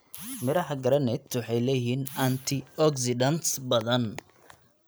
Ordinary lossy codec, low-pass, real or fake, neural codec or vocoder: none; none; real; none